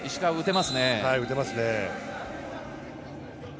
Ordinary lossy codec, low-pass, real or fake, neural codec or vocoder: none; none; real; none